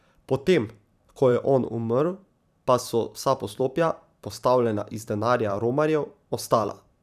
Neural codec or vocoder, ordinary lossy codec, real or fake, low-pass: vocoder, 44.1 kHz, 128 mel bands every 512 samples, BigVGAN v2; none; fake; 14.4 kHz